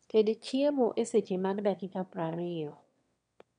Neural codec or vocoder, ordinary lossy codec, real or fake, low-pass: autoencoder, 22.05 kHz, a latent of 192 numbers a frame, VITS, trained on one speaker; none; fake; 9.9 kHz